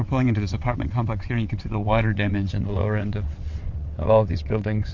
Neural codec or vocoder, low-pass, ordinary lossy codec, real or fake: vocoder, 22.05 kHz, 80 mel bands, WaveNeXt; 7.2 kHz; MP3, 48 kbps; fake